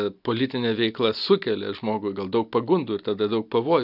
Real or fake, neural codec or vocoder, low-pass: real; none; 5.4 kHz